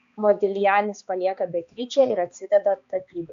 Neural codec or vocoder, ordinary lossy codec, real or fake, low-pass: codec, 16 kHz, 2 kbps, X-Codec, HuBERT features, trained on balanced general audio; AAC, 96 kbps; fake; 7.2 kHz